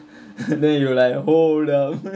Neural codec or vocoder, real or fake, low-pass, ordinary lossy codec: none; real; none; none